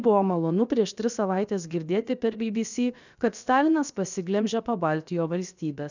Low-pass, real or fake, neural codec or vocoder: 7.2 kHz; fake; codec, 16 kHz, 0.7 kbps, FocalCodec